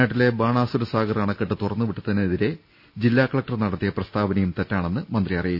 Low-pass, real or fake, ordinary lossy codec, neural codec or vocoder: 5.4 kHz; real; none; none